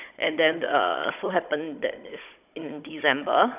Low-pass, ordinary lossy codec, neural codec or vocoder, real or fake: 3.6 kHz; none; codec, 16 kHz, 16 kbps, FunCodec, trained on Chinese and English, 50 frames a second; fake